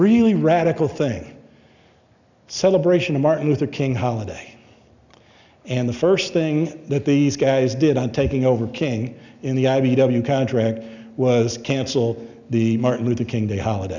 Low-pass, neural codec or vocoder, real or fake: 7.2 kHz; none; real